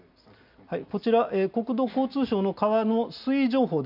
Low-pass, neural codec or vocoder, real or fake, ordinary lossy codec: 5.4 kHz; none; real; none